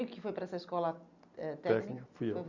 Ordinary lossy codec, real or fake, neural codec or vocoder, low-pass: Opus, 64 kbps; real; none; 7.2 kHz